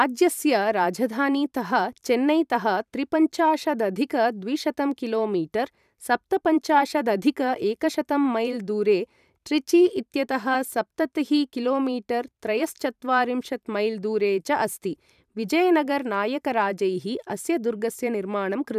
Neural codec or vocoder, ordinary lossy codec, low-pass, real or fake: vocoder, 44.1 kHz, 128 mel bands every 512 samples, BigVGAN v2; none; 14.4 kHz; fake